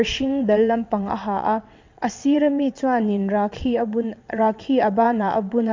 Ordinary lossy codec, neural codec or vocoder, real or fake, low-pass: MP3, 48 kbps; vocoder, 22.05 kHz, 80 mel bands, WaveNeXt; fake; 7.2 kHz